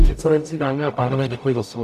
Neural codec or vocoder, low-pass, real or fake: codec, 44.1 kHz, 0.9 kbps, DAC; 14.4 kHz; fake